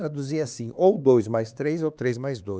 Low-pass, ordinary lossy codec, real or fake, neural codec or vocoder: none; none; fake; codec, 16 kHz, 4 kbps, X-Codec, HuBERT features, trained on LibriSpeech